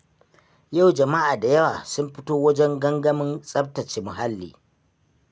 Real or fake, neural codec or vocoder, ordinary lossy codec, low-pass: real; none; none; none